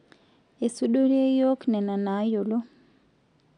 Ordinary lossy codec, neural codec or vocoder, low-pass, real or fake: none; none; 9.9 kHz; real